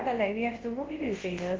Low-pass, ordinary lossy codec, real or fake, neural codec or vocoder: 7.2 kHz; Opus, 16 kbps; fake; codec, 24 kHz, 0.9 kbps, WavTokenizer, large speech release